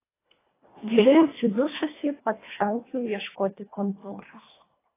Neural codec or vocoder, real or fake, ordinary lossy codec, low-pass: codec, 24 kHz, 1.5 kbps, HILCodec; fake; AAC, 16 kbps; 3.6 kHz